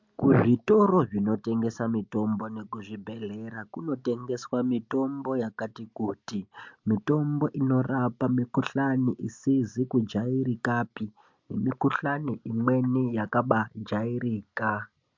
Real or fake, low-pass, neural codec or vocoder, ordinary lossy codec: real; 7.2 kHz; none; MP3, 64 kbps